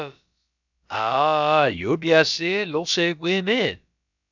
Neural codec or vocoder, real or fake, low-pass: codec, 16 kHz, about 1 kbps, DyCAST, with the encoder's durations; fake; 7.2 kHz